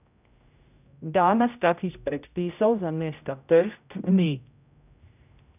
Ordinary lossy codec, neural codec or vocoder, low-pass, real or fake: none; codec, 16 kHz, 0.5 kbps, X-Codec, HuBERT features, trained on general audio; 3.6 kHz; fake